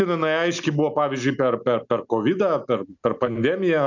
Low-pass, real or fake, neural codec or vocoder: 7.2 kHz; real; none